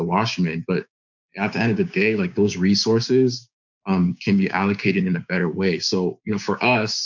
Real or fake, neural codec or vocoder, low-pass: fake; codec, 16 kHz, 6 kbps, DAC; 7.2 kHz